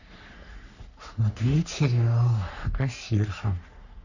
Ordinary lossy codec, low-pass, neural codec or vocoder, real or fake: none; 7.2 kHz; codec, 44.1 kHz, 3.4 kbps, Pupu-Codec; fake